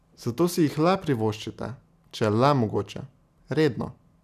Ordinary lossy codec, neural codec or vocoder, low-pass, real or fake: none; none; 14.4 kHz; real